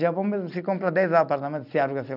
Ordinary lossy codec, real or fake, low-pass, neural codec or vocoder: none; real; 5.4 kHz; none